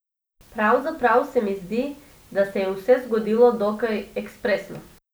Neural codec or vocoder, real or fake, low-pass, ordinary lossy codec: none; real; none; none